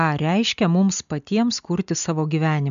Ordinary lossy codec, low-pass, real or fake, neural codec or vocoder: AAC, 96 kbps; 7.2 kHz; real; none